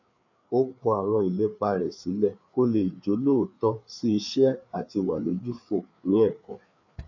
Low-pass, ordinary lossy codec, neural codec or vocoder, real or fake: 7.2 kHz; none; codec, 16 kHz, 4 kbps, FreqCodec, larger model; fake